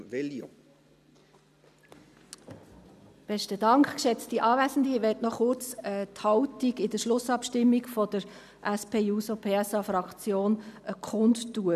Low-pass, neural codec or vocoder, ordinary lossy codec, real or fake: 14.4 kHz; none; none; real